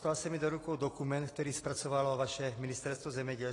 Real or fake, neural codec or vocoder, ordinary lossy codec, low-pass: real; none; AAC, 32 kbps; 10.8 kHz